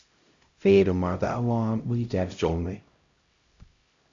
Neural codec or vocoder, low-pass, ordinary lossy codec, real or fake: codec, 16 kHz, 0.5 kbps, X-Codec, HuBERT features, trained on LibriSpeech; 7.2 kHz; Opus, 64 kbps; fake